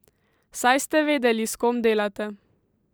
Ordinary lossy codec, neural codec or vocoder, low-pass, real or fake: none; vocoder, 44.1 kHz, 128 mel bands every 512 samples, BigVGAN v2; none; fake